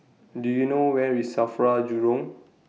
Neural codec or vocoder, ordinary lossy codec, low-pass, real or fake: none; none; none; real